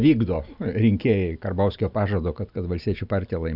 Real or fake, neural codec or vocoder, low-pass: real; none; 5.4 kHz